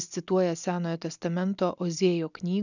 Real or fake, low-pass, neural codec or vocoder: real; 7.2 kHz; none